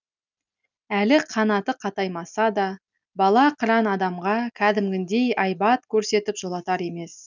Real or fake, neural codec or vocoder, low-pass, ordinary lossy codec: real; none; 7.2 kHz; none